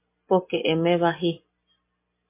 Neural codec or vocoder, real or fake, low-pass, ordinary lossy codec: none; real; 3.6 kHz; MP3, 24 kbps